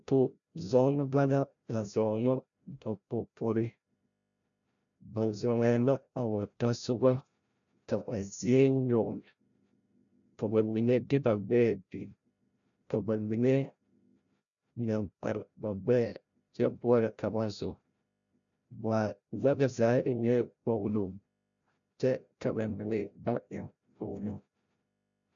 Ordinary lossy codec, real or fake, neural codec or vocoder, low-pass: MP3, 96 kbps; fake; codec, 16 kHz, 0.5 kbps, FreqCodec, larger model; 7.2 kHz